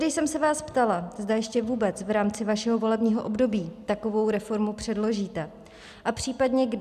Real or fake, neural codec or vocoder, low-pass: real; none; 14.4 kHz